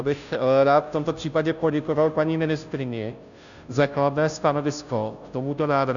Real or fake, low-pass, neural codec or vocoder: fake; 7.2 kHz; codec, 16 kHz, 0.5 kbps, FunCodec, trained on Chinese and English, 25 frames a second